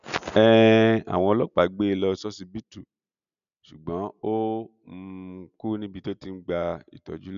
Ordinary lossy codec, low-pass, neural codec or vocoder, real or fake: none; 7.2 kHz; none; real